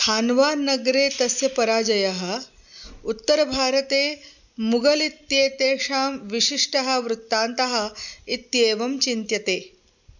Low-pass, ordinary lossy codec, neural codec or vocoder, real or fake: 7.2 kHz; none; none; real